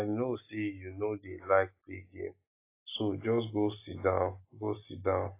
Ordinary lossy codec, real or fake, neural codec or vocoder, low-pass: AAC, 24 kbps; real; none; 3.6 kHz